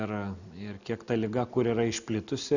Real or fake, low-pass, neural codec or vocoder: real; 7.2 kHz; none